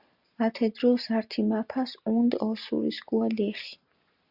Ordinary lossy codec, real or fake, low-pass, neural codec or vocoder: Opus, 64 kbps; real; 5.4 kHz; none